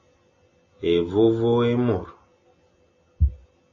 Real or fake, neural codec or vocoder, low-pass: real; none; 7.2 kHz